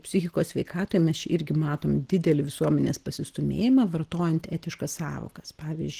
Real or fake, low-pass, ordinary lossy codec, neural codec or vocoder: real; 14.4 kHz; Opus, 16 kbps; none